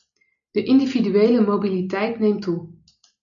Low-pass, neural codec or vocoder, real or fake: 7.2 kHz; none; real